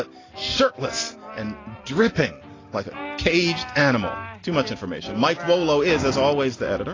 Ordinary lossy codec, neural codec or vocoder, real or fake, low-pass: AAC, 32 kbps; none; real; 7.2 kHz